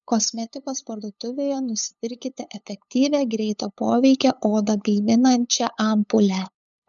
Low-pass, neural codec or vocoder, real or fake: 7.2 kHz; codec, 16 kHz, 8 kbps, FunCodec, trained on LibriTTS, 25 frames a second; fake